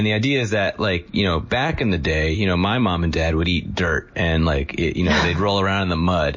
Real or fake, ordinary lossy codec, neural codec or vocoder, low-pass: real; MP3, 32 kbps; none; 7.2 kHz